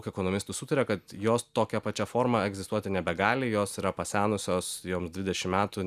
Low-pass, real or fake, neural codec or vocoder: 14.4 kHz; real; none